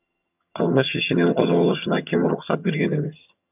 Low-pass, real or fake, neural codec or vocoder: 3.6 kHz; fake; vocoder, 22.05 kHz, 80 mel bands, HiFi-GAN